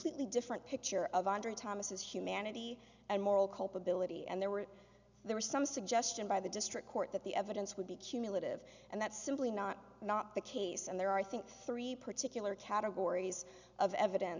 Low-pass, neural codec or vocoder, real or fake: 7.2 kHz; none; real